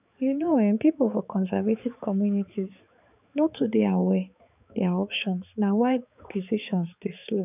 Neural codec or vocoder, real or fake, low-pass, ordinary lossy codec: codec, 16 kHz, 4 kbps, X-Codec, HuBERT features, trained on balanced general audio; fake; 3.6 kHz; none